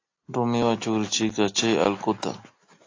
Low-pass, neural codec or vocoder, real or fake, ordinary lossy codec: 7.2 kHz; none; real; AAC, 48 kbps